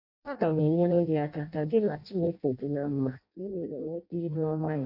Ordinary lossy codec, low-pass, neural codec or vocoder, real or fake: none; 5.4 kHz; codec, 16 kHz in and 24 kHz out, 0.6 kbps, FireRedTTS-2 codec; fake